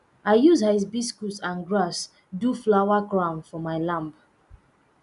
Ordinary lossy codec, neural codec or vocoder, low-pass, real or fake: none; none; 10.8 kHz; real